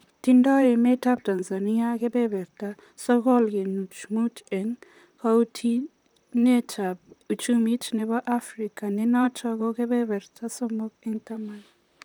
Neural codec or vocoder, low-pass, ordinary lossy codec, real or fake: vocoder, 44.1 kHz, 128 mel bands, Pupu-Vocoder; none; none; fake